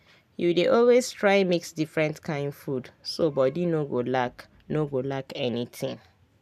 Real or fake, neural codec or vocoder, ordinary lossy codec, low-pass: real; none; none; 14.4 kHz